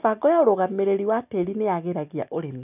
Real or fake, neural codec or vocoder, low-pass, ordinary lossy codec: real; none; 3.6 kHz; none